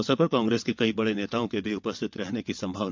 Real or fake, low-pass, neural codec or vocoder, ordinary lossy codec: fake; 7.2 kHz; vocoder, 22.05 kHz, 80 mel bands, WaveNeXt; MP3, 64 kbps